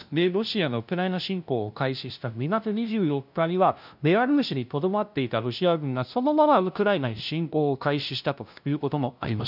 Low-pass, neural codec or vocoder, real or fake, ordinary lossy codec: 5.4 kHz; codec, 16 kHz, 0.5 kbps, FunCodec, trained on LibriTTS, 25 frames a second; fake; none